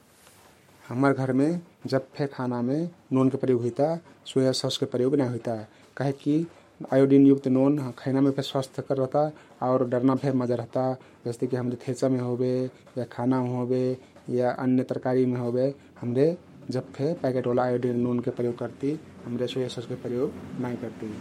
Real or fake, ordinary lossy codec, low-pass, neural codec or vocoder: fake; MP3, 64 kbps; 19.8 kHz; codec, 44.1 kHz, 7.8 kbps, Pupu-Codec